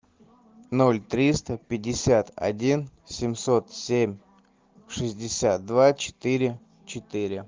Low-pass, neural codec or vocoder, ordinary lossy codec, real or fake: 7.2 kHz; none; Opus, 24 kbps; real